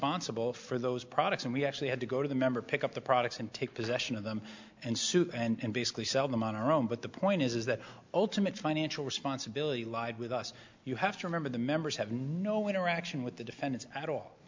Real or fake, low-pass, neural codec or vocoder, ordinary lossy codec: real; 7.2 kHz; none; MP3, 64 kbps